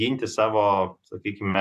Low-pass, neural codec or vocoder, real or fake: 14.4 kHz; none; real